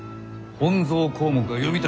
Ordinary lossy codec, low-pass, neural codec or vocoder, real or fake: none; none; none; real